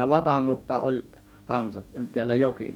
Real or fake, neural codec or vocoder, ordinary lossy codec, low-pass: fake; codec, 44.1 kHz, 2.6 kbps, DAC; none; 19.8 kHz